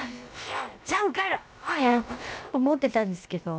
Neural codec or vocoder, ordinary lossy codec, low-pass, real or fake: codec, 16 kHz, about 1 kbps, DyCAST, with the encoder's durations; none; none; fake